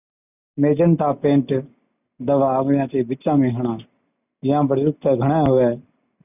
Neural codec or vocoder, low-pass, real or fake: none; 3.6 kHz; real